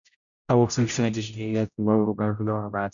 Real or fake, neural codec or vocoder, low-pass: fake; codec, 16 kHz, 0.5 kbps, X-Codec, HuBERT features, trained on general audio; 7.2 kHz